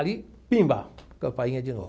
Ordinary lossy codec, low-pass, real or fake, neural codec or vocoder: none; none; real; none